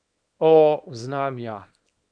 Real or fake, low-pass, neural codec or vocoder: fake; 9.9 kHz; codec, 24 kHz, 0.9 kbps, WavTokenizer, small release